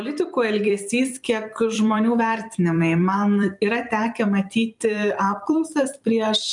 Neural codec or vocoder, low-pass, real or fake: vocoder, 44.1 kHz, 128 mel bands every 256 samples, BigVGAN v2; 10.8 kHz; fake